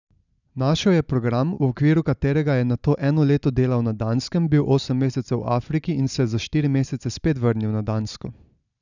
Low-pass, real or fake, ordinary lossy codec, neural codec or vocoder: 7.2 kHz; real; none; none